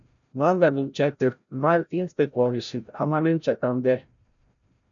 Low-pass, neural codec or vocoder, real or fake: 7.2 kHz; codec, 16 kHz, 0.5 kbps, FreqCodec, larger model; fake